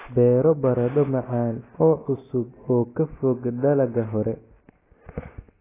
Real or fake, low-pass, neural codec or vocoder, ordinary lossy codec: real; 3.6 kHz; none; AAC, 16 kbps